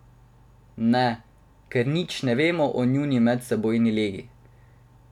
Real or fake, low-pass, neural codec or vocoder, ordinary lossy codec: real; 19.8 kHz; none; none